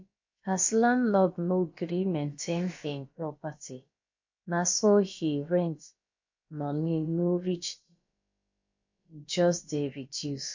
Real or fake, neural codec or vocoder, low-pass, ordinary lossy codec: fake; codec, 16 kHz, about 1 kbps, DyCAST, with the encoder's durations; 7.2 kHz; MP3, 48 kbps